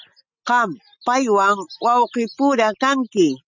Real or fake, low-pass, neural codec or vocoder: real; 7.2 kHz; none